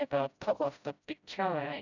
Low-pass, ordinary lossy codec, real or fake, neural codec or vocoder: 7.2 kHz; none; fake; codec, 16 kHz, 0.5 kbps, FreqCodec, smaller model